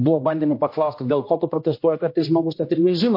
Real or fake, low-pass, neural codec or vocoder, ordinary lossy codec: fake; 5.4 kHz; codec, 16 kHz, 1 kbps, X-Codec, HuBERT features, trained on general audio; MP3, 32 kbps